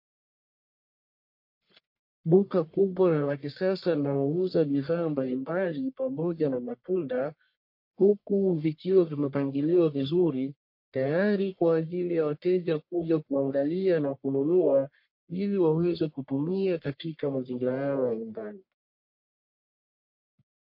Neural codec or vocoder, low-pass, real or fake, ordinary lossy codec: codec, 44.1 kHz, 1.7 kbps, Pupu-Codec; 5.4 kHz; fake; MP3, 32 kbps